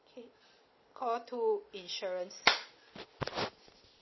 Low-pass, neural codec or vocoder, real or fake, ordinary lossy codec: 7.2 kHz; none; real; MP3, 24 kbps